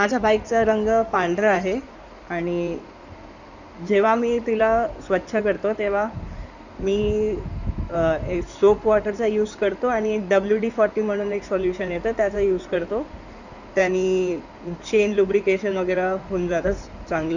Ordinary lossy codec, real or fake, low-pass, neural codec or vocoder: none; fake; 7.2 kHz; codec, 16 kHz in and 24 kHz out, 2.2 kbps, FireRedTTS-2 codec